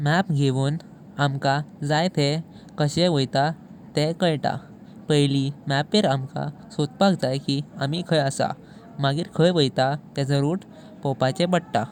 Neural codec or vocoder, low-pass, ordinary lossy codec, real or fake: none; 19.8 kHz; none; real